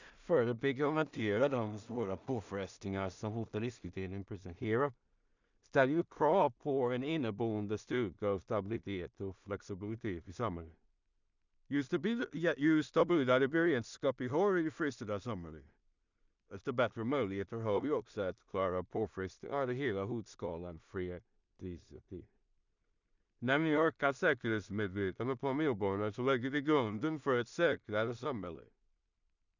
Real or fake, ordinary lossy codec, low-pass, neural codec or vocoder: fake; none; 7.2 kHz; codec, 16 kHz in and 24 kHz out, 0.4 kbps, LongCat-Audio-Codec, two codebook decoder